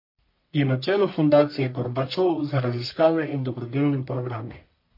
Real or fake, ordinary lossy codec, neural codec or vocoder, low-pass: fake; MP3, 32 kbps; codec, 44.1 kHz, 1.7 kbps, Pupu-Codec; 5.4 kHz